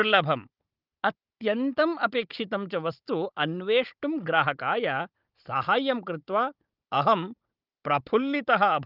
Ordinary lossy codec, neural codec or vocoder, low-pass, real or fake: Opus, 32 kbps; codec, 16 kHz, 16 kbps, FunCodec, trained on Chinese and English, 50 frames a second; 5.4 kHz; fake